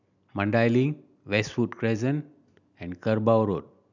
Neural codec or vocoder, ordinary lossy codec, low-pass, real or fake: none; none; 7.2 kHz; real